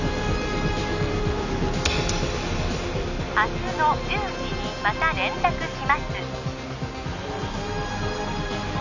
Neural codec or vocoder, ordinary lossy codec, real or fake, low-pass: none; none; real; 7.2 kHz